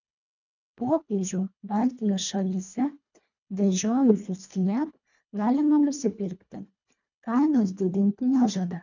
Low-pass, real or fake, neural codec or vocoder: 7.2 kHz; fake; codec, 24 kHz, 1.5 kbps, HILCodec